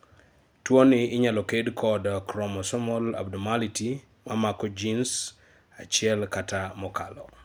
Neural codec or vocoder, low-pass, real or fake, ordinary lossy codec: none; none; real; none